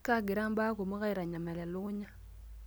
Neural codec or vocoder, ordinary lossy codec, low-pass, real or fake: none; none; none; real